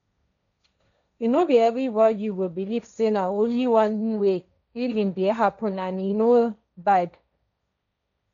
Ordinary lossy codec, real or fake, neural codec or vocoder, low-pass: none; fake; codec, 16 kHz, 1.1 kbps, Voila-Tokenizer; 7.2 kHz